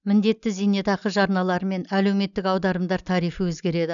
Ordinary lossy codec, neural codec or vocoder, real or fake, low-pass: none; none; real; 7.2 kHz